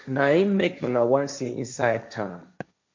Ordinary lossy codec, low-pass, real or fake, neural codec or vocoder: MP3, 48 kbps; 7.2 kHz; fake; codec, 16 kHz, 1.1 kbps, Voila-Tokenizer